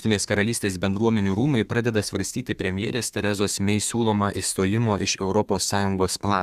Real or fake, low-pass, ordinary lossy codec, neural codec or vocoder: fake; 14.4 kHz; Opus, 64 kbps; codec, 32 kHz, 1.9 kbps, SNAC